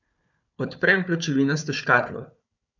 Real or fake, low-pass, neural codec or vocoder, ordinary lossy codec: fake; 7.2 kHz; codec, 16 kHz, 4 kbps, FunCodec, trained on Chinese and English, 50 frames a second; none